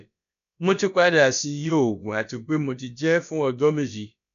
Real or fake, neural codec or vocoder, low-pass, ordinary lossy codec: fake; codec, 16 kHz, about 1 kbps, DyCAST, with the encoder's durations; 7.2 kHz; none